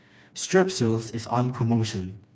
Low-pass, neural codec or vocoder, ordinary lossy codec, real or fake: none; codec, 16 kHz, 2 kbps, FreqCodec, smaller model; none; fake